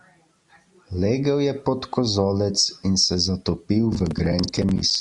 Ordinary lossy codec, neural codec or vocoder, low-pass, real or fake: Opus, 64 kbps; none; 10.8 kHz; real